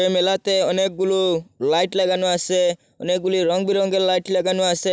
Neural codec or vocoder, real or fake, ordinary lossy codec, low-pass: none; real; none; none